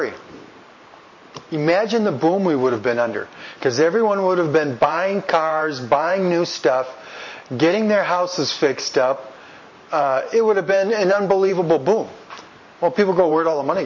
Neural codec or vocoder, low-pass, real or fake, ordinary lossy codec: vocoder, 44.1 kHz, 128 mel bands every 512 samples, BigVGAN v2; 7.2 kHz; fake; MP3, 32 kbps